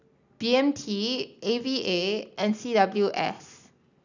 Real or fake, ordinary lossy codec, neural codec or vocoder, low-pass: real; none; none; 7.2 kHz